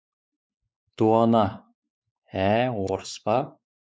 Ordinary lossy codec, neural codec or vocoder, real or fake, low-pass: none; codec, 16 kHz, 4 kbps, X-Codec, WavLM features, trained on Multilingual LibriSpeech; fake; none